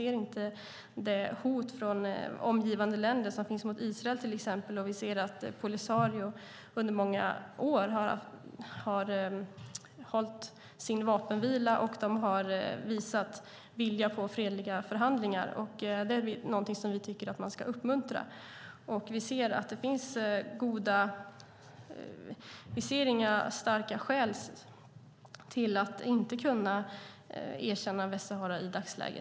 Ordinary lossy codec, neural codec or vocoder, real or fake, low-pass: none; none; real; none